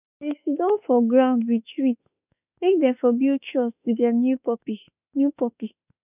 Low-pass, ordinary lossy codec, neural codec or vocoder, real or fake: 3.6 kHz; none; autoencoder, 48 kHz, 32 numbers a frame, DAC-VAE, trained on Japanese speech; fake